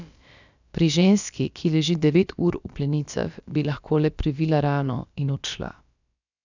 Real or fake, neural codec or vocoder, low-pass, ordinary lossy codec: fake; codec, 16 kHz, about 1 kbps, DyCAST, with the encoder's durations; 7.2 kHz; none